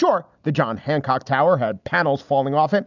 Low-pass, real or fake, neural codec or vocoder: 7.2 kHz; real; none